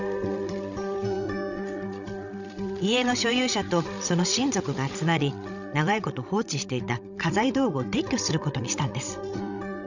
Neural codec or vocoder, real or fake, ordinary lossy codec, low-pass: codec, 16 kHz, 16 kbps, FreqCodec, larger model; fake; none; 7.2 kHz